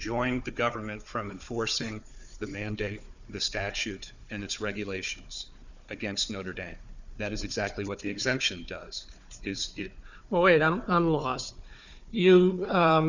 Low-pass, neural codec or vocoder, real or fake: 7.2 kHz; codec, 16 kHz, 4 kbps, FunCodec, trained on Chinese and English, 50 frames a second; fake